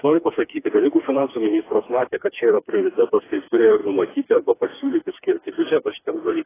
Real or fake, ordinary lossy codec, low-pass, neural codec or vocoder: fake; AAC, 16 kbps; 3.6 kHz; codec, 16 kHz, 2 kbps, FreqCodec, smaller model